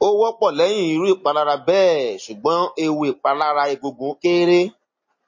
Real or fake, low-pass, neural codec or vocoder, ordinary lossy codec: real; 7.2 kHz; none; MP3, 32 kbps